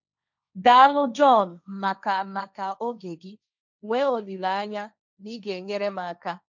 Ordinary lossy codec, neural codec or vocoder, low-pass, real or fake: none; codec, 16 kHz, 1.1 kbps, Voila-Tokenizer; 7.2 kHz; fake